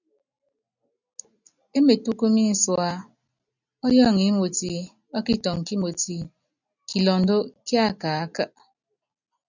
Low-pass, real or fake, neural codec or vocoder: 7.2 kHz; real; none